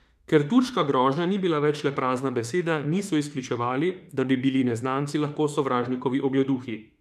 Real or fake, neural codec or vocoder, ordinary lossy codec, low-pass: fake; autoencoder, 48 kHz, 32 numbers a frame, DAC-VAE, trained on Japanese speech; none; 14.4 kHz